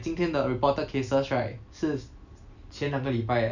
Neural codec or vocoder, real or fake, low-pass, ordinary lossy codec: none; real; 7.2 kHz; none